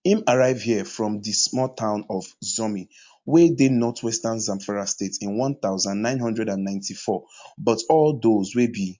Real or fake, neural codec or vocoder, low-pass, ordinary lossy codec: real; none; 7.2 kHz; MP3, 48 kbps